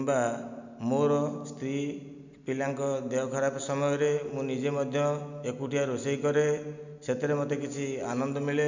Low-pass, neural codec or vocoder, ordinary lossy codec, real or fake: 7.2 kHz; none; none; real